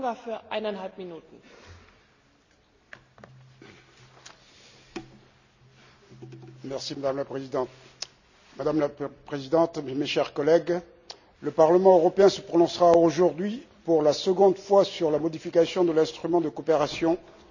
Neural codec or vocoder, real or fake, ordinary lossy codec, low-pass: none; real; none; 7.2 kHz